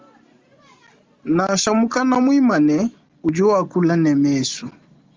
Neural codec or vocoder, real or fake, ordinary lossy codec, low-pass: none; real; Opus, 32 kbps; 7.2 kHz